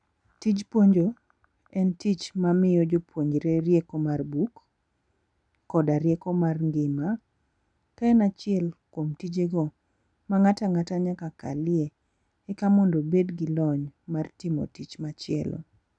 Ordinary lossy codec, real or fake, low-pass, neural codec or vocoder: none; real; 9.9 kHz; none